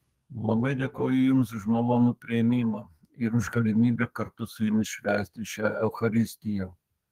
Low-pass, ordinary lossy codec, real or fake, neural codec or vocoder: 14.4 kHz; Opus, 24 kbps; fake; codec, 32 kHz, 1.9 kbps, SNAC